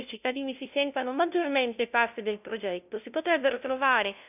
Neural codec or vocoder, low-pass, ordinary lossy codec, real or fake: codec, 16 kHz, 0.5 kbps, FunCodec, trained on LibriTTS, 25 frames a second; 3.6 kHz; none; fake